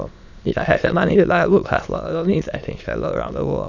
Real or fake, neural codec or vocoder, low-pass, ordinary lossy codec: fake; autoencoder, 22.05 kHz, a latent of 192 numbers a frame, VITS, trained on many speakers; 7.2 kHz; none